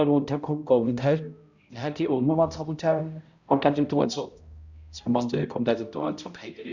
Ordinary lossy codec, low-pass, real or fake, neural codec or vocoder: Opus, 64 kbps; 7.2 kHz; fake; codec, 16 kHz, 0.5 kbps, X-Codec, HuBERT features, trained on balanced general audio